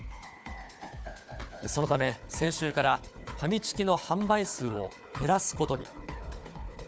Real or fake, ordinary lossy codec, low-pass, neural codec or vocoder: fake; none; none; codec, 16 kHz, 4 kbps, FunCodec, trained on Chinese and English, 50 frames a second